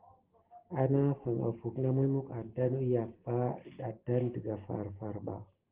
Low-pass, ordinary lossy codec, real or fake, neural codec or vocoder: 3.6 kHz; Opus, 16 kbps; real; none